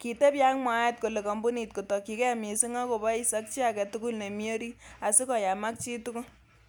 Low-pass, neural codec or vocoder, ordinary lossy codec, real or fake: none; none; none; real